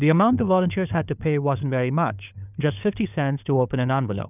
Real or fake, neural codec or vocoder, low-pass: fake; codec, 16 kHz, 4 kbps, FunCodec, trained on LibriTTS, 50 frames a second; 3.6 kHz